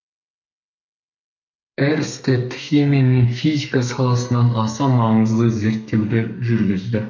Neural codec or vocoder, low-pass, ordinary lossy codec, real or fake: codec, 32 kHz, 1.9 kbps, SNAC; 7.2 kHz; none; fake